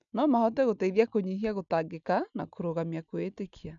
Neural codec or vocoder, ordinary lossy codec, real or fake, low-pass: none; none; real; 7.2 kHz